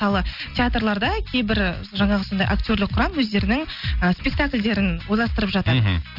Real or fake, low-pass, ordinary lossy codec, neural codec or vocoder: real; 5.4 kHz; none; none